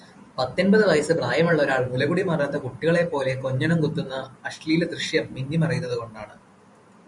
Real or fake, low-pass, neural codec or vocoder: fake; 10.8 kHz; vocoder, 44.1 kHz, 128 mel bands every 512 samples, BigVGAN v2